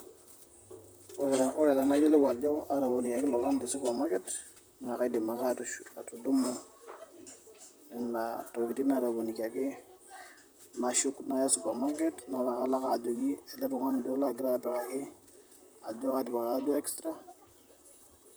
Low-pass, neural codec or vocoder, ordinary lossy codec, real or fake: none; vocoder, 44.1 kHz, 128 mel bands, Pupu-Vocoder; none; fake